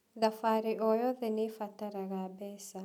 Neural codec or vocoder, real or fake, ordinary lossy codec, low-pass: none; real; none; 19.8 kHz